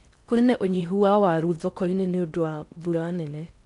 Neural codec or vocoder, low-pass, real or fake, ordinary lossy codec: codec, 16 kHz in and 24 kHz out, 0.6 kbps, FocalCodec, streaming, 4096 codes; 10.8 kHz; fake; none